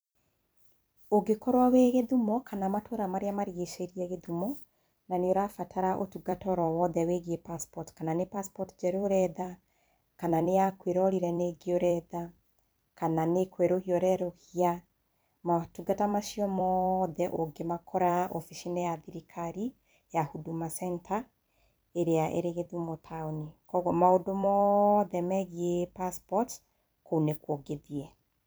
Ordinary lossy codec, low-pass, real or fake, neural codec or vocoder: none; none; fake; vocoder, 44.1 kHz, 128 mel bands every 256 samples, BigVGAN v2